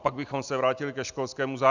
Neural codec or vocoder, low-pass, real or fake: none; 7.2 kHz; real